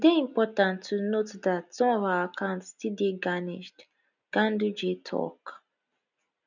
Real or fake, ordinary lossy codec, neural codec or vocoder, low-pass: real; none; none; 7.2 kHz